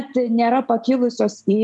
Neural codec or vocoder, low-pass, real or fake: none; 10.8 kHz; real